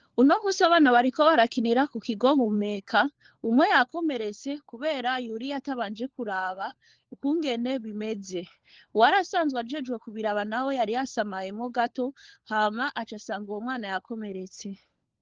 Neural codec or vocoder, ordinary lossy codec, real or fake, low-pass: codec, 16 kHz, 4 kbps, FunCodec, trained on LibriTTS, 50 frames a second; Opus, 16 kbps; fake; 7.2 kHz